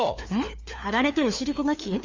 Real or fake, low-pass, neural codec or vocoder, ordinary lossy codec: fake; 7.2 kHz; codec, 16 kHz, 4 kbps, FunCodec, trained on LibriTTS, 50 frames a second; Opus, 32 kbps